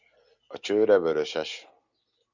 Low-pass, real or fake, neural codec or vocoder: 7.2 kHz; real; none